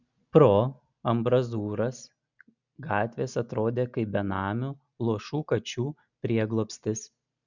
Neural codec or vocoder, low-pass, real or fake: none; 7.2 kHz; real